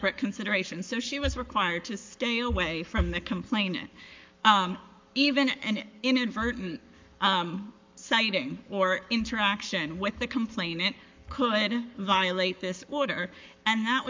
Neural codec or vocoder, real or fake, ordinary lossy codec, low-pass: codec, 44.1 kHz, 7.8 kbps, Pupu-Codec; fake; MP3, 64 kbps; 7.2 kHz